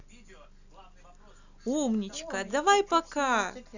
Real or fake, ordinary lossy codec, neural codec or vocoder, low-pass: real; none; none; 7.2 kHz